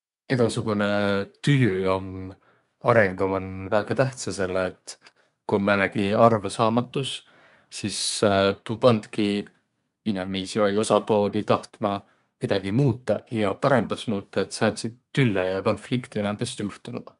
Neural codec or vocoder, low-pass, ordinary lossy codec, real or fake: codec, 24 kHz, 1 kbps, SNAC; 10.8 kHz; none; fake